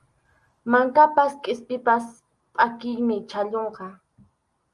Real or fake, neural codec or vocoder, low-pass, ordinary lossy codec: real; none; 10.8 kHz; Opus, 32 kbps